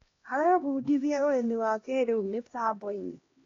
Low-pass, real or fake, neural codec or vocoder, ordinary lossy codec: 7.2 kHz; fake; codec, 16 kHz, 1 kbps, X-Codec, HuBERT features, trained on LibriSpeech; AAC, 32 kbps